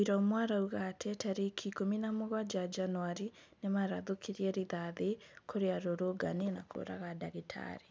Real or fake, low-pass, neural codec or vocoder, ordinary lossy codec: real; none; none; none